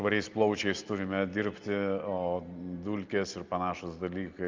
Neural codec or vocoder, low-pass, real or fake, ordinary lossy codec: none; 7.2 kHz; real; Opus, 24 kbps